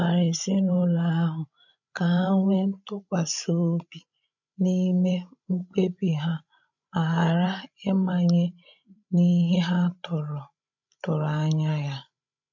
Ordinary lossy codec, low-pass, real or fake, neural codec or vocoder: none; 7.2 kHz; fake; codec, 16 kHz, 16 kbps, FreqCodec, larger model